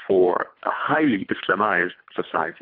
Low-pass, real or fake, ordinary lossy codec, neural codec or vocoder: 5.4 kHz; fake; MP3, 48 kbps; codec, 24 kHz, 3 kbps, HILCodec